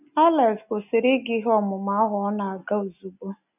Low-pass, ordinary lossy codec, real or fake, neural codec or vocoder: 3.6 kHz; none; real; none